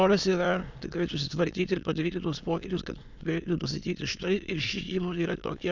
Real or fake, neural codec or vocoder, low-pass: fake; autoencoder, 22.05 kHz, a latent of 192 numbers a frame, VITS, trained on many speakers; 7.2 kHz